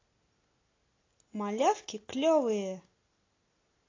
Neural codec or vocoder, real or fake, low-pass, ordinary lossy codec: none; real; 7.2 kHz; AAC, 32 kbps